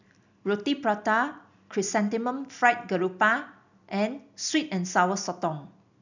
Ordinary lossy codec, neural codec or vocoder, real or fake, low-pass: none; none; real; 7.2 kHz